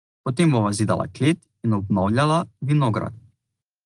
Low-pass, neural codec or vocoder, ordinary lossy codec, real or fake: 10.8 kHz; none; Opus, 24 kbps; real